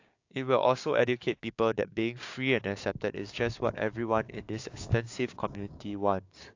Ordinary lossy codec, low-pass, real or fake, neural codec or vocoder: AAC, 48 kbps; 7.2 kHz; fake; codec, 16 kHz, 6 kbps, DAC